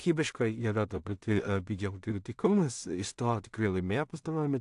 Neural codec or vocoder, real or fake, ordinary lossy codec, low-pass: codec, 16 kHz in and 24 kHz out, 0.4 kbps, LongCat-Audio-Codec, two codebook decoder; fake; AAC, 64 kbps; 10.8 kHz